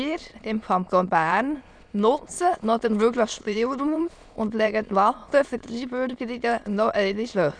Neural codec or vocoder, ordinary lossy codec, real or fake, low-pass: autoencoder, 22.05 kHz, a latent of 192 numbers a frame, VITS, trained on many speakers; none; fake; 9.9 kHz